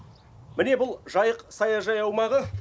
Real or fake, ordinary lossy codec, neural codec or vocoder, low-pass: real; none; none; none